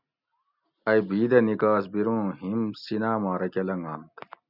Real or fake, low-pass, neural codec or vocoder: real; 5.4 kHz; none